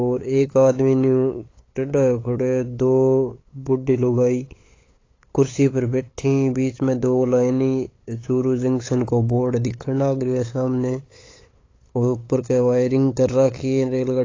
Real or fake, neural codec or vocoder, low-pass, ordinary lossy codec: fake; codec, 16 kHz, 8 kbps, FunCodec, trained on LibriTTS, 25 frames a second; 7.2 kHz; AAC, 32 kbps